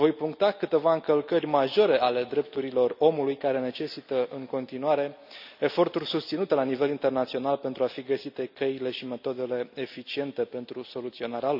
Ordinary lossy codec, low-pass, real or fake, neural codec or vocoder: none; 5.4 kHz; real; none